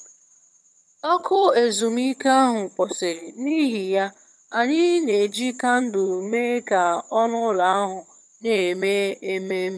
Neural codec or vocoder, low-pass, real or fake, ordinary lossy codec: vocoder, 22.05 kHz, 80 mel bands, HiFi-GAN; none; fake; none